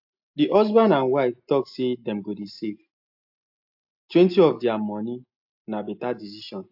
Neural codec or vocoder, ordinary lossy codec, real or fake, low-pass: none; AAC, 48 kbps; real; 5.4 kHz